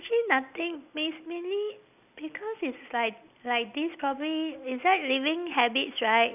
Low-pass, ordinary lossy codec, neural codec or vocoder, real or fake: 3.6 kHz; none; none; real